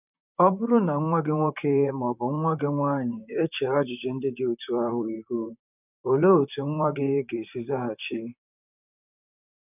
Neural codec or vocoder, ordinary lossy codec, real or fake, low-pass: vocoder, 44.1 kHz, 128 mel bands, Pupu-Vocoder; none; fake; 3.6 kHz